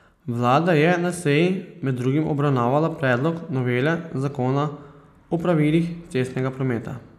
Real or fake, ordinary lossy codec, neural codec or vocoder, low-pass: real; none; none; 14.4 kHz